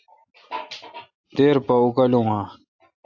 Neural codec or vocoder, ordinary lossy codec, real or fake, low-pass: none; AAC, 48 kbps; real; 7.2 kHz